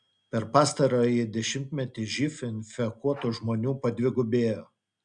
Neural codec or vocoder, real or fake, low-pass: none; real; 9.9 kHz